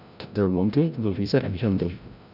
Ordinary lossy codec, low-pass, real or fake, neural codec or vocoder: none; 5.4 kHz; fake; codec, 16 kHz, 0.5 kbps, FreqCodec, larger model